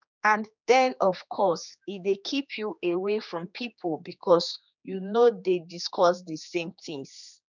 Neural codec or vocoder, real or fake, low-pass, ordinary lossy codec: codec, 16 kHz, 2 kbps, X-Codec, HuBERT features, trained on general audio; fake; 7.2 kHz; none